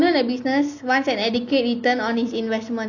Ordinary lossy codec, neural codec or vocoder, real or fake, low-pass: none; none; real; 7.2 kHz